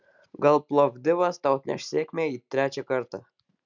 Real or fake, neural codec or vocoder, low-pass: real; none; 7.2 kHz